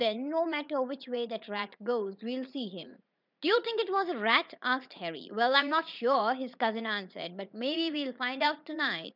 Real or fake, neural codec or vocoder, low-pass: fake; vocoder, 44.1 kHz, 80 mel bands, Vocos; 5.4 kHz